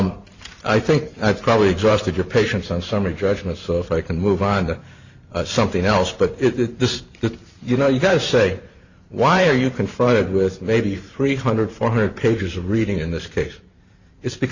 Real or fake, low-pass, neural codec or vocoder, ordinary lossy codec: fake; 7.2 kHz; vocoder, 44.1 kHz, 128 mel bands every 512 samples, BigVGAN v2; Opus, 64 kbps